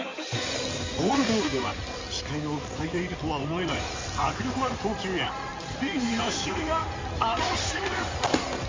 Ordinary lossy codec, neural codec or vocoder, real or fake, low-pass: MP3, 64 kbps; codec, 16 kHz in and 24 kHz out, 2.2 kbps, FireRedTTS-2 codec; fake; 7.2 kHz